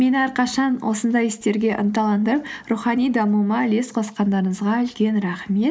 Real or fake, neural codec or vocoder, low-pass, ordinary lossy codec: real; none; none; none